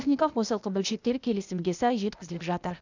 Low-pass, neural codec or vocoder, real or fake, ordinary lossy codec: 7.2 kHz; codec, 16 kHz, 0.8 kbps, ZipCodec; fake; none